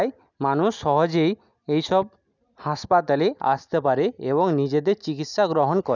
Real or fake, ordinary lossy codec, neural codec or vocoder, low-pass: real; none; none; 7.2 kHz